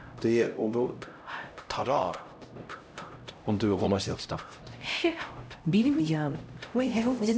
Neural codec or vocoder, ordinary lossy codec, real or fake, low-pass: codec, 16 kHz, 0.5 kbps, X-Codec, HuBERT features, trained on LibriSpeech; none; fake; none